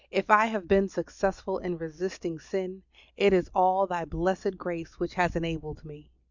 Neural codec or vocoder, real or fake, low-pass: none; real; 7.2 kHz